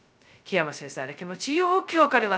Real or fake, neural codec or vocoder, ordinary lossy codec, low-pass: fake; codec, 16 kHz, 0.2 kbps, FocalCodec; none; none